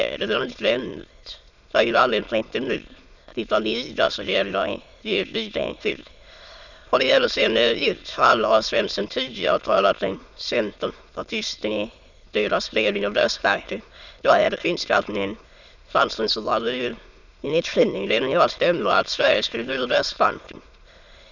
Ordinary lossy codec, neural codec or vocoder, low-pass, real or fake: none; autoencoder, 22.05 kHz, a latent of 192 numbers a frame, VITS, trained on many speakers; 7.2 kHz; fake